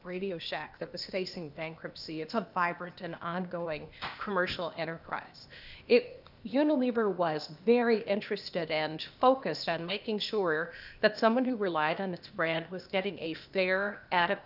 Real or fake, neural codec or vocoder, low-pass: fake; codec, 16 kHz, 0.8 kbps, ZipCodec; 5.4 kHz